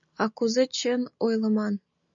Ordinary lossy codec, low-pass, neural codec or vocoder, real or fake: MP3, 64 kbps; 7.2 kHz; none; real